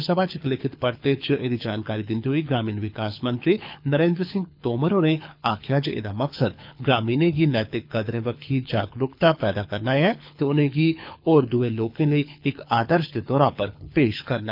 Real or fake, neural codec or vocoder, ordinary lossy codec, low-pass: fake; codec, 24 kHz, 6 kbps, HILCodec; none; 5.4 kHz